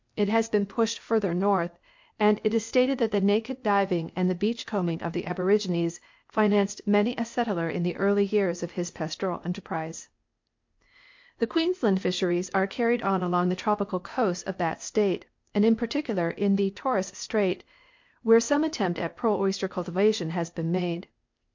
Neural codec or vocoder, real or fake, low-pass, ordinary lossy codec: codec, 16 kHz, 0.8 kbps, ZipCodec; fake; 7.2 kHz; MP3, 48 kbps